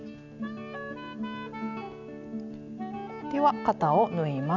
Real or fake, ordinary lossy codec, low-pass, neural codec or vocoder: real; none; 7.2 kHz; none